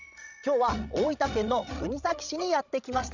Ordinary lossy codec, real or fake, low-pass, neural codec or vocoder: none; fake; 7.2 kHz; codec, 16 kHz, 16 kbps, FreqCodec, larger model